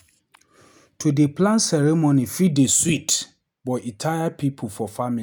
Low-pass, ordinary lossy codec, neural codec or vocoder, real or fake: none; none; none; real